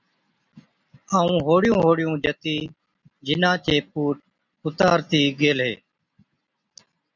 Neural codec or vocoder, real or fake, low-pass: none; real; 7.2 kHz